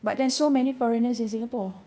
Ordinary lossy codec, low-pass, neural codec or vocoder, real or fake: none; none; codec, 16 kHz, 0.8 kbps, ZipCodec; fake